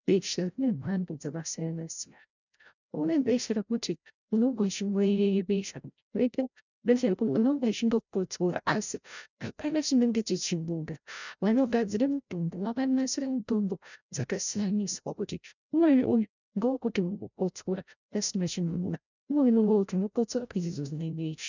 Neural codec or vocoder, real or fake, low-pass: codec, 16 kHz, 0.5 kbps, FreqCodec, larger model; fake; 7.2 kHz